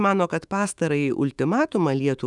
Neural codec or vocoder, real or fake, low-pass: autoencoder, 48 kHz, 128 numbers a frame, DAC-VAE, trained on Japanese speech; fake; 10.8 kHz